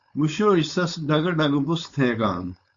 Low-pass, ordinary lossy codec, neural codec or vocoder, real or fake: 7.2 kHz; Opus, 64 kbps; codec, 16 kHz, 4.8 kbps, FACodec; fake